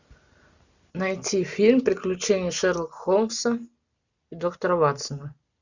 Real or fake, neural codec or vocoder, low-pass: real; none; 7.2 kHz